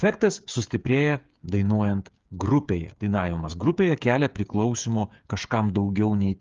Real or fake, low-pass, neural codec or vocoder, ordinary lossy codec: fake; 7.2 kHz; codec, 16 kHz, 8 kbps, FreqCodec, smaller model; Opus, 32 kbps